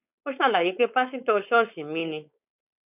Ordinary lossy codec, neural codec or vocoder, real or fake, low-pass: none; codec, 16 kHz, 4.8 kbps, FACodec; fake; 3.6 kHz